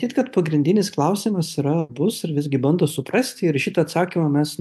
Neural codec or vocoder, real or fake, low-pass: none; real; 14.4 kHz